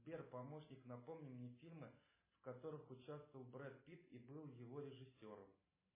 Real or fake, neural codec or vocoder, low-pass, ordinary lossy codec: real; none; 3.6 kHz; MP3, 16 kbps